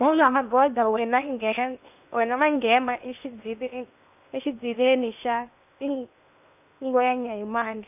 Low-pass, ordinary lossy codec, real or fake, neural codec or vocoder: 3.6 kHz; none; fake; codec, 16 kHz in and 24 kHz out, 0.8 kbps, FocalCodec, streaming, 65536 codes